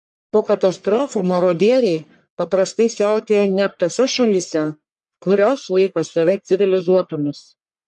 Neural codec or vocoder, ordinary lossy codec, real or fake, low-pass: codec, 44.1 kHz, 1.7 kbps, Pupu-Codec; MP3, 64 kbps; fake; 10.8 kHz